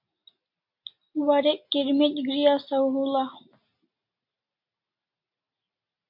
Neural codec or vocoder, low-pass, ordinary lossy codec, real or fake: none; 5.4 kHz; MP3, 48 kbps; real